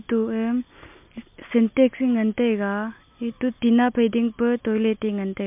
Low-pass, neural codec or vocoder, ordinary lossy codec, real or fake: 3.6 kHz; none; MP3, 32 kbps; real